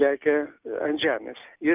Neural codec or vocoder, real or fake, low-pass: none; real; 3.6 kHz